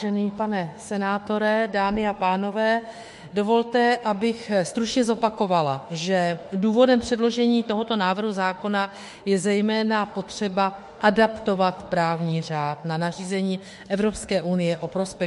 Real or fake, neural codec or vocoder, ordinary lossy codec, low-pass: fake; autoencoder, 48 kHz, 32 numbers a frame, DAC-VAE, trained on Japanese speech; MP3, 48 kbps; 14.4 kHz